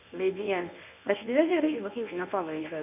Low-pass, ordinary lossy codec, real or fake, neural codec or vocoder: 3.6 kHz; none; fake; codec, 24 kHz, 0.9 kbps, WavTokenizer, medium speech release version 1